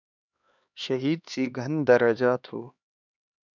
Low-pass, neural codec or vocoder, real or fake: 7.2 kHz; codec, 16 kHz, 2 kbps, X-Codec, HuBERT features, trained on LibriSpeech; fake